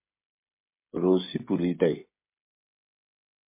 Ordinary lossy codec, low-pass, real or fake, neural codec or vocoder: MP3, 24 kbps; 3.6 kHz; fake; codec, 16 kHz, 16 kbps, FreqCodec, smaller model